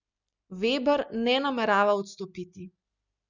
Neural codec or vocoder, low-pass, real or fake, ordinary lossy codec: none; 7.2 kHz; real; none